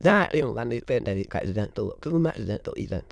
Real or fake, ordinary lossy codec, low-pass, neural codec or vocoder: fake; none; none; autoencoder, 22.05 kHz, a latent of 192 numbers a frame, VITS, trained on many speakers